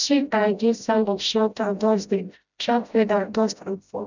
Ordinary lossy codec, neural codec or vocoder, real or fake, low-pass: none; codec, 16 kHz, 0.5 kbps, FreqCodec, smaller model; fake; 7.2 kHz